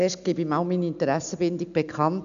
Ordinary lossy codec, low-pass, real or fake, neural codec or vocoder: none; 7.2 kHz; real; none